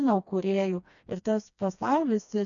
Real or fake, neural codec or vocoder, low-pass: fake; codec, 16 kHz, 2 kbps, FreqCodec, smaller model; 7.2 kHz